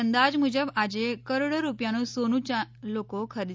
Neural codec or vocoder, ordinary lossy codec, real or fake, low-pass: none; none; real; none